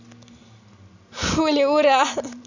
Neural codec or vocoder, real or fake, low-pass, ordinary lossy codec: none; real; 7.2 kHz; none